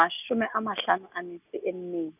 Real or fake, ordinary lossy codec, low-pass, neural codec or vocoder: real; none; 3.6 kHz; none